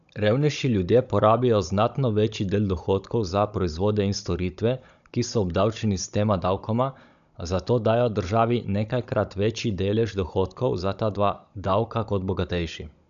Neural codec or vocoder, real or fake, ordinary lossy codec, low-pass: codec, 16 kHz, 16 kbps, FunCodec, trained on Chinese and English, 50 frames a second; fake; none; 7.2 kHz